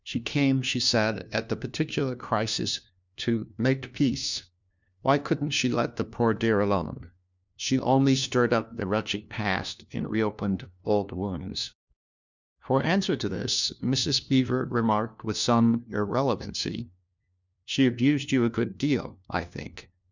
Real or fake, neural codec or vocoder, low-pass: fake; codec, 16 kHz, 1 kbps, FunCodec, trained on LibriTTS, 50 frames a second; 7.2 kHz